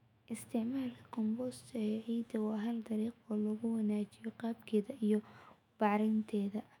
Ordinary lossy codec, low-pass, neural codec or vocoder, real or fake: none; 19.8 kHz; autoencoder, 48 kHz, 128 numbers a frame, DAC-VAE, trained on Japanese speech; fake